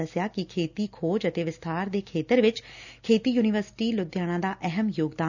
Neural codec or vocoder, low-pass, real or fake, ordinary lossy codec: none; 7.2 kHz; real; none